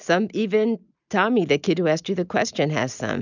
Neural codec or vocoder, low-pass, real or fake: none; 7.2 kHz; real